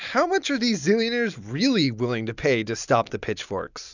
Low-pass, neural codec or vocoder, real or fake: 7.2 kHz; none; real